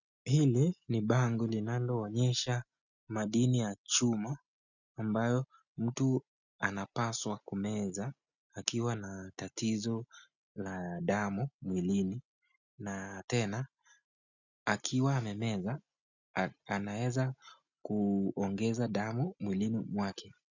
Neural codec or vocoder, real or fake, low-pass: none; real; 7.2 kHz